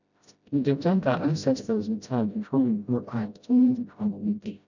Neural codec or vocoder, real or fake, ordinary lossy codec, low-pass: codec, 16 kHz, 0.5 kbps, FreqCodec, smaller model; fake; none; 7.2 kHz